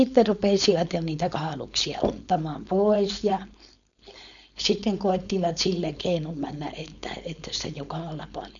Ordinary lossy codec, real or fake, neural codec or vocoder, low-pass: none; fake; codec, 16 kHz, 4.8 kbps, FACodec; 7.2 kHz